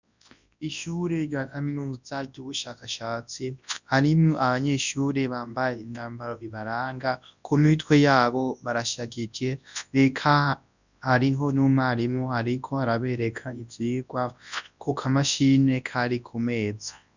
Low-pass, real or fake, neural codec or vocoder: 7.2 kHz; fake; codec, 24 kHz, 0.9 kbps, WavTokenizer, large speech release